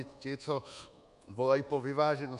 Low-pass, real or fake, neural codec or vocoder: 10.8 kHz; fake; codec, 24 kHz, 1.2 kbps, DualCodec